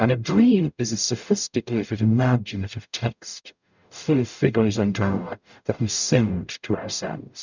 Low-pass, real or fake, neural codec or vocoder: 7.2 kHz; fake; codec, 44.1 kHz, 0.9 kbps, DAC